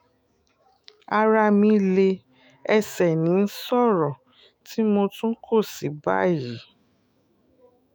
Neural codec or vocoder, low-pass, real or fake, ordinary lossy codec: autoencoder, 48 kHz, 128 numbers a frame, DAC-VAE, trained on Japanese speech; none; fake; none